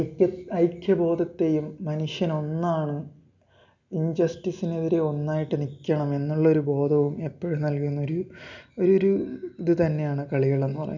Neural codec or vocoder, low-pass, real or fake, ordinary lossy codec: none; 7.2 kHz; real; none